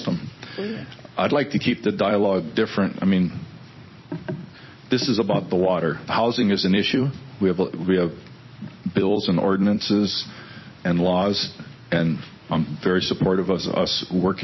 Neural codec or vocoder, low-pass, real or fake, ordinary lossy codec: vocoder, 44.1 kHz, 128 mel bands every 256 samples, BigVGAN v2; 7.2 kHz; fake; MP3, 24 kbps